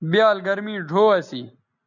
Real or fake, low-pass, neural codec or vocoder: real; 7.2 kHz; none